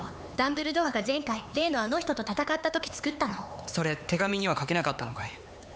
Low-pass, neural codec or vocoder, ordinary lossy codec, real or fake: none; codec, 16 kHz, 4 kbps, X-Codec, HuBERT features, trained on LibriSpeech; none; fake